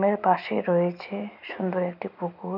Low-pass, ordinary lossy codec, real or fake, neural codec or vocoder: 5.4 kHz; none; real; none